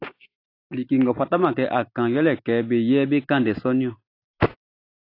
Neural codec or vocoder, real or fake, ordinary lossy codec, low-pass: none; real; AAC, 32 kbps; 5.4 kHz